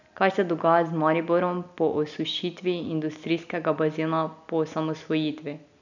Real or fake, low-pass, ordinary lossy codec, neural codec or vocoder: real; 7.2 kHz; none; none